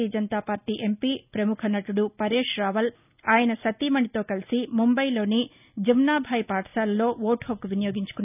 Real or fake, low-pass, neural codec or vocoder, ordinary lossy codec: real; 3.6 kHz; none; none